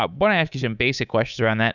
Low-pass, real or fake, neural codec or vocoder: 7.2 kHz; fake; codec, 24 kHz, 3.1 kbps, DualCodec